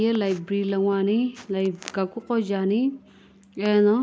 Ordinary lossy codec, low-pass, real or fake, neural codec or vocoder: none; none; real; none